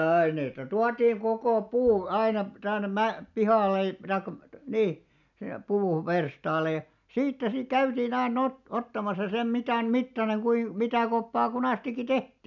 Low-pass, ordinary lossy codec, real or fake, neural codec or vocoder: 7.2 kHz; none; real; none